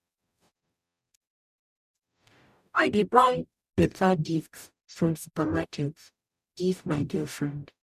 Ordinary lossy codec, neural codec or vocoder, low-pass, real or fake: none; codec, 44.1 kHz, 0.9 kbps, DAC; 14.4 kHz; fake